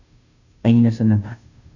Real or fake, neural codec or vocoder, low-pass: fake; codec, 16 kHz, 0.5 kbps, FunCodec, trained on Chinese and English, 25 frames a second; 7.2 kHz